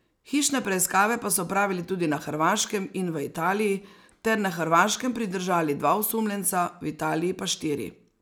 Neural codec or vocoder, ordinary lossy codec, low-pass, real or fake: none; none; none; real